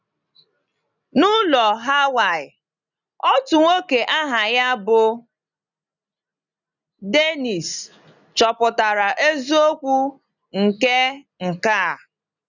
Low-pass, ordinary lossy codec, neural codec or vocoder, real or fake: 7.2 kHz; none; none; real